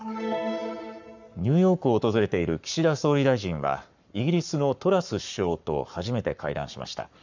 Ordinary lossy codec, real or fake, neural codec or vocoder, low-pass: none; fake; codec, 44.1 kHz, 7.8 kbps, Pupu-Codec; 7.2 kHz